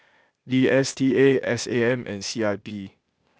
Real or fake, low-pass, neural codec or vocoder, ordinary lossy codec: fake; none; codec, 16 kHz, 0.8 kbps, ZipCodec; none